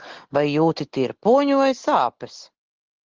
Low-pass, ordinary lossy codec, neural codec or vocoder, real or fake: 7.2 kHz; Opus, 16 kbps; none; real